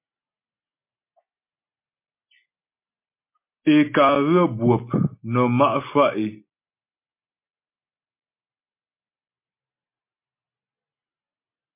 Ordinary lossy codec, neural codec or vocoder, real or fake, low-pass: MP3, 24 kbps; none; real; 3.6 kHz